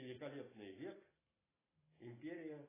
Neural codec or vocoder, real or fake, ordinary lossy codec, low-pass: none; real; AAC, 16 kbps; 3.6 kHz